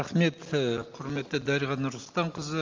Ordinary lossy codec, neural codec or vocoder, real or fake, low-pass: Opus, 32 kbps; none; real; 7.2 kHz